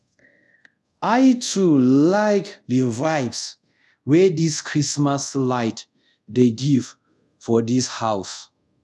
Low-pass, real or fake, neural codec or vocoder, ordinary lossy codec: none; fake; codec, 24 kHz, 0.5 kbps, DualCodec; none